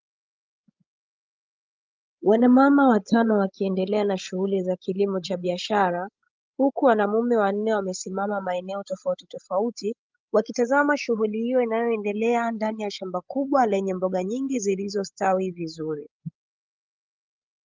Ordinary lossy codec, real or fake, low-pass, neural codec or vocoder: Opus, 32 kbps; fake; 7.2 kHz; codec, 16 kHz, 16 kbps, FreqCodec, larger model